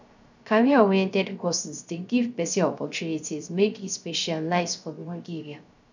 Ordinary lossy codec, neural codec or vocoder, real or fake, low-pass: none; codec, 16 kHz, 0.3 kbps, FocalCodec; fake; 7.2 kHz